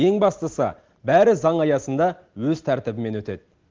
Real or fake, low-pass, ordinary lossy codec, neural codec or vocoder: real; 7.2 kHz; Opus, 16 kbps; none